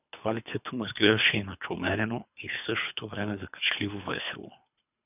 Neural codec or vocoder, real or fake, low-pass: codec, 24 kHz, 3 kbps, HILCodec; fake; 3.6 kHz